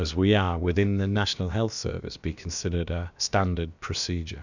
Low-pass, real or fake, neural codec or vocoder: 7.2 kHz; fake; codec, 16 kHz, about 1 kbps, DyCAST, with the encoder's durations